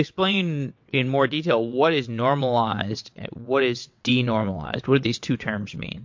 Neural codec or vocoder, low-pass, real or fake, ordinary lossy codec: vocoder, 22.05 kHz, 80 mel bands, WaveNeXt; 7.2 kHz; fake; MP3, 48 kbps